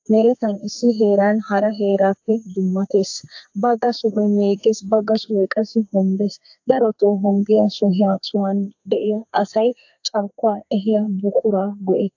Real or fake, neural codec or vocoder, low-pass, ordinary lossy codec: fake; codec, 32 kHz, 1.9 kbps, SNAC; 7.2 kHz; AAC, 48 kbps